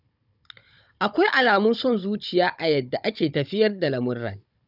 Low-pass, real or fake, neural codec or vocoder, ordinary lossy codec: 5.4 kHz; fake; codec, 16 kHz, 16 kbps, FunCodec, trained on Chinese and English, 50 frames a second; none